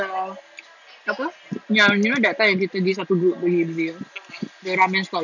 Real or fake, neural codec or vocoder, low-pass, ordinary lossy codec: real; none; 7.2 kHz; none